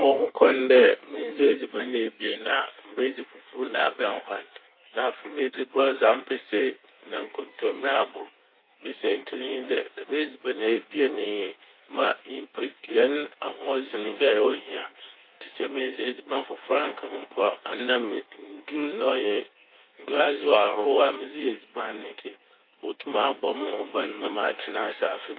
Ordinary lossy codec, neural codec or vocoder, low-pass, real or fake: AAC, 32 kbps; codec, 16 kHz in and 24 kHz out, 1.1 kbps, FireRedTTS-2 codec; 5.4 kHz; fake